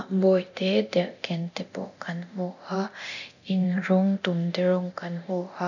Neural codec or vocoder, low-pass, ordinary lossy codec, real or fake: codec, 24 kHz, 0.9 kbps, DualCodec; 7.2 kHz; none; fake